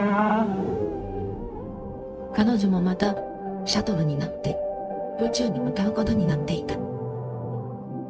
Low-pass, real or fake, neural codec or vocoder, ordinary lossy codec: none; fake; codec, 16 kHz, 0.4 kbps, LongCat-Audio-Codec; none